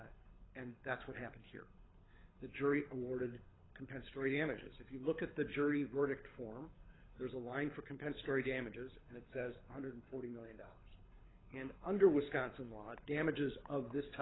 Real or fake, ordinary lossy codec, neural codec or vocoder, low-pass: fake; AAC, 16 kbps; codec, 24 kHz, 6 kbps, HILCodec; 7.2 kHz